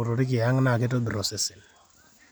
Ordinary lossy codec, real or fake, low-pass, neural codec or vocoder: none; real; none; none